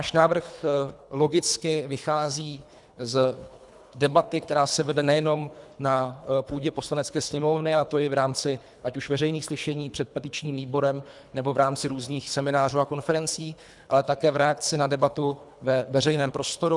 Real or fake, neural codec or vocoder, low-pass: fake; codec, 24 kHz, 3 kbps, HILCodec; 10.8 kHz